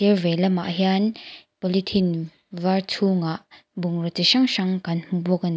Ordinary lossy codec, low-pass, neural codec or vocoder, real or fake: none; none; none; real